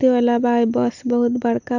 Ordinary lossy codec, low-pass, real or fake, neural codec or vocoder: none; 7.2 kHz; real; none